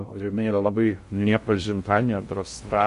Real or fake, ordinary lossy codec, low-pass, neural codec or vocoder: fake; MP3, 48 kbps; 10.8 kHz; codec, 16 kHz in and 24 kHz out, 0.6 kbps, FocalCodec, streaming, 2048 codes